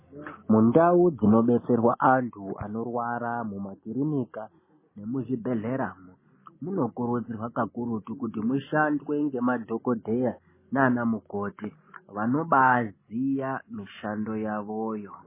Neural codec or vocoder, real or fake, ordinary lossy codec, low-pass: none; real; MP3, 16 kbps; 3.6 kHz